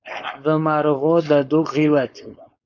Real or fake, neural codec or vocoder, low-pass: fake; codec, 16 kHz, 4.8 kbps, FACodec; 7.2 kHz